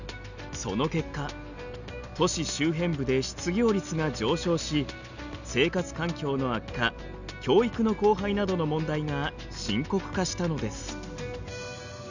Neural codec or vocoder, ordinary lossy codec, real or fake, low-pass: none; none; real; 7.2 kHz